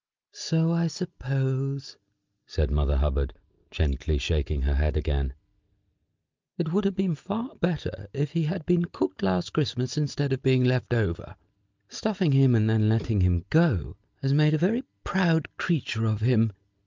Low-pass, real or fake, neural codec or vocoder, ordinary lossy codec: 7.2 kHz; real; none; Opus, 24 kbps